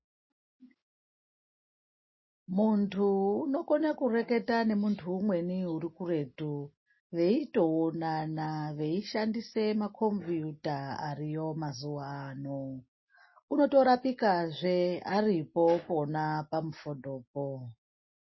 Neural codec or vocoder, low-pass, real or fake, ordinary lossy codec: none; 7.2 kHz; real; MP3, 24 kbps